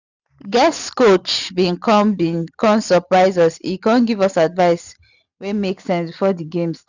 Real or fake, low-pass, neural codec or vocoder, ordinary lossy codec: real; 7.2 kHz; none; none